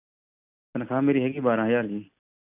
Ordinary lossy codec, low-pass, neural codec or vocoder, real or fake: none; 3.6 kHz; none; real